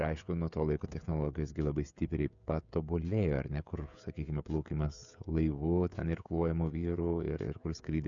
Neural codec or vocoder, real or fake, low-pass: codec, 16 kHz, 16 kbps, FreqCodec, smaller model; fake; 7.2 kHz